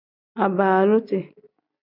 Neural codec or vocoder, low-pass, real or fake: none; 5.4 kHz; real